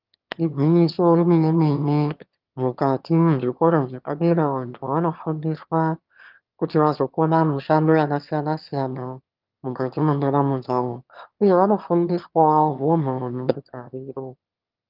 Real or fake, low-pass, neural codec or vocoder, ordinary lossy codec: fake; 5.4 kHz; autoencoder, 22.05 kHz, a latent of 192 numbers a frame, VITS, trained on one speaker; Opus, 24 kbps